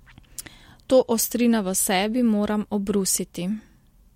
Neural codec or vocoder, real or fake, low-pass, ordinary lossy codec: none; real; 19.8 kHz; MP3, 64 kbps